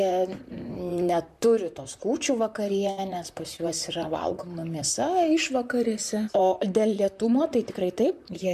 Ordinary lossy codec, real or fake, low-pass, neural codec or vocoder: MP3, 96 kbps; fake; 14.4 kHz; vocoder, 44.1 kHz, 128 mel bands, Pupu-Vocoder